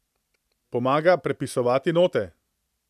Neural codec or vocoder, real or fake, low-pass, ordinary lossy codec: none; real; 14.4 kHz; none